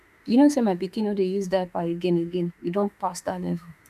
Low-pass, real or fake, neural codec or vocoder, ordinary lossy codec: 14.4 kHz; fake; autoencoder, 48 kHz, 32 numbers a frame, DAC-VAE, trained on Japanese speech; none